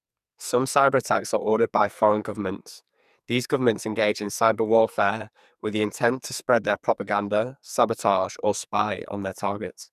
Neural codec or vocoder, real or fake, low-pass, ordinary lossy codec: codec, 44.1 kHz, 2.6 kbps, SNAC; fake; 14.4 kHz; none